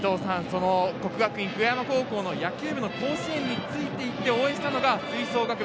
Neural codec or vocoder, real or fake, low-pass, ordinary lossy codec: none; real; none; none